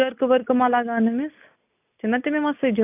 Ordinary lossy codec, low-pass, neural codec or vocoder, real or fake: none; 3.6 kHz; none; real